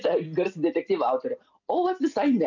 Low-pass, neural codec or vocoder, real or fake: 7.2 kHz; none; real